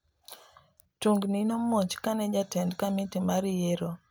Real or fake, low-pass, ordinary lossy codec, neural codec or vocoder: real; none; none; none